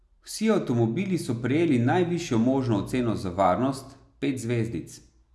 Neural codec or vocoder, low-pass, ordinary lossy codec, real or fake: none; none; none; real